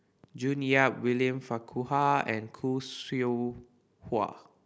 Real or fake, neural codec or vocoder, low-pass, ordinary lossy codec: real; none; none; none